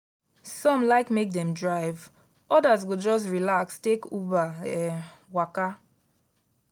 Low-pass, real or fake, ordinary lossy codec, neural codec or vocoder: 19.8 kHz; real; none; none